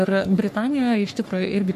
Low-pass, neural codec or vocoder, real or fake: 14.4 kHz; codec, 44.1 kHz, 3.4 kbps, Pupu-Codec; fake